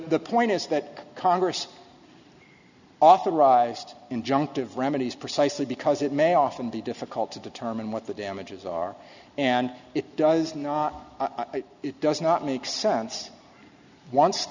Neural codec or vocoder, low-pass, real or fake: none; 7.2 kHz; real